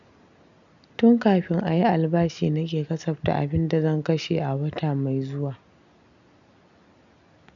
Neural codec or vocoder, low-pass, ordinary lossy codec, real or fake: none; 7.2 kHz; none; real